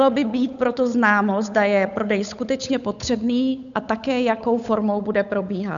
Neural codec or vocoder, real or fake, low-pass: codec, 16 kHz, 8 kbps, FunCodec, trained on Chinese and English, 25 frames a second; fake; 7.2 kHz